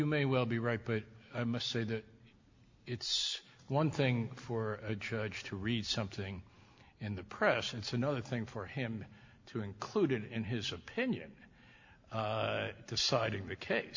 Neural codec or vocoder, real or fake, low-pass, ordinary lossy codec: none; real; 7.2 kHz; MP3, 48 kbps